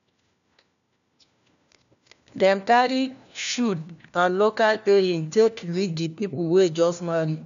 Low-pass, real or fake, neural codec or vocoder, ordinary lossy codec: 7.2 kHz; fake; codec, 16 kHz, 1 kbps, FunCodec, trained on LibriTTS, 50 frames a second; none